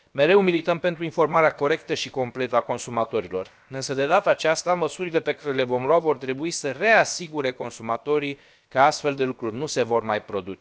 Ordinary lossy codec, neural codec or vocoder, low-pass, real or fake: none; codec, 16 kHz, about 1 kbps, DyCAST, with the encoder's durations; none; fake